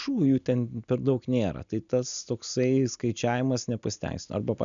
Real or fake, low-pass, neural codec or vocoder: real; 7.2 kHz; none